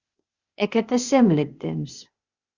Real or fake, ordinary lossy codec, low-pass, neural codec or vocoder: fake; Opus, 64 kbps; 7.2 kHz; codec, 16 kHz, 0.8 kbps, ZipCodec